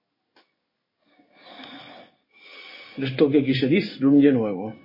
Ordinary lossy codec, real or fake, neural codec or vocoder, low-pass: MP3, 24 kbps; fake; codec, 16 kHz in and 24 kHz out, 1 kbps, XY-Tokenizer; 5.4 kHz